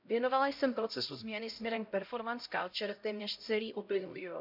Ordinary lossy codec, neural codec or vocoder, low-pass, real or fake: none; codec, 16 kHz, 0.5 kbps, X-Codec, HuBERT features, trained on LibriSpeech; 5.4 kHz; fake